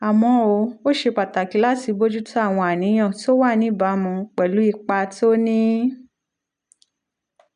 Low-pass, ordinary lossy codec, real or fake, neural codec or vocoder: 9.9 kHz; none; real; none